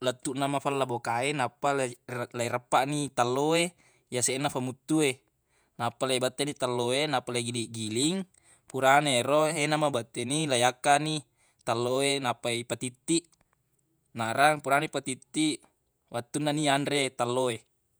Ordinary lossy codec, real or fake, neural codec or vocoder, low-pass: none; fake; vocoder, 48 kHz, 128 mel bands, Vocos; none